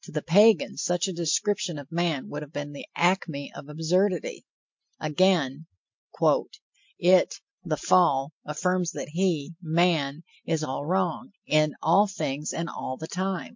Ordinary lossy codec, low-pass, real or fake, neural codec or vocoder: MP3, 48 kbps; 7.2 kHz; real; none